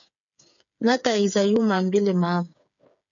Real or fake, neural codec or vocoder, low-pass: fake; codec, 16 kHz, 8 kbps, FreqCodec, smaller model; 7.2 kHz